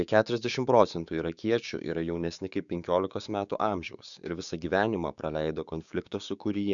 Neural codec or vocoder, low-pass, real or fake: codec, 16 kHz, 6 kbps, DAC; 7.2 kHz; fake